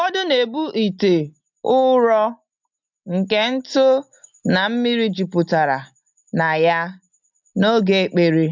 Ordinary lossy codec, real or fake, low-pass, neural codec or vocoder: MP3, 64 kbps; real; 7.2 kHz; none